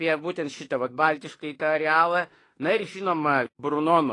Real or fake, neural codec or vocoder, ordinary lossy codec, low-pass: fake; autoencoder, 48 kHz, 32 numbers a frame, DAC-VAE, trained on Japanese speech; AAC, 32 kbps; 10.8 kHz